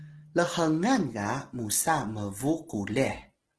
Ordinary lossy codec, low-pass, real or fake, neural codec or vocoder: Opus, 16 kbps; 10.8 kHz; real; none